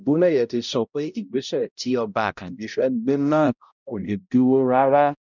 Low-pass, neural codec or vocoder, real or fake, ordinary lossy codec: 7.2 kHz; codec, 16 kHz, 0.5 kbps, X-Codec, HuBERT features, trained on balanced general audio; fake; none